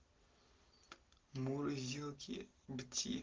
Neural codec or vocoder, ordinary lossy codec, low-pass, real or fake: none; Opus, 16 kbps; 7.2 kHz; real